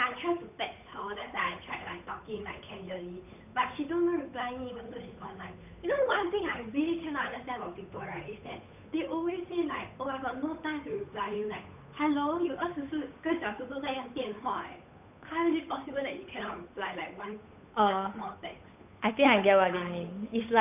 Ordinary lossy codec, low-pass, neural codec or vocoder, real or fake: none; 3.6 kHz; codec, 16 kHz, 8 kbps, FunCodec, trained on Chinese and English, 25 frames a second; fake